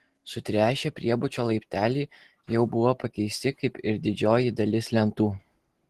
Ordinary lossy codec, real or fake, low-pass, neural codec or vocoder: Opus, 16 kbps; real; 14.4 kHz; none